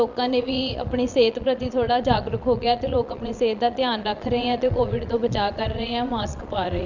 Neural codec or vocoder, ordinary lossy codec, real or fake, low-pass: vocoder, 22.05 kHz, 80 mel bands, Vocos; Opus, 64 kbps; fake; 7.2 kHz